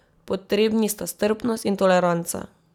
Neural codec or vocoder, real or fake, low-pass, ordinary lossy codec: none; real; 19.8 kHz; none